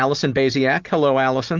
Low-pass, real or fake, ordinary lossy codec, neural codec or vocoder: 7.2 kHz; real; Opus, 32 kbps; none